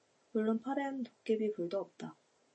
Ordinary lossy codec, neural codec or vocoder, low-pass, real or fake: MP3, 32 kbps; none; 9.9 kHz; real